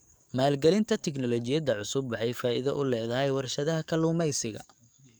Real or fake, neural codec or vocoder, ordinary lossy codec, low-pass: fake; codec, 44.1 kHz, 7.8 kbps, DAC; none; none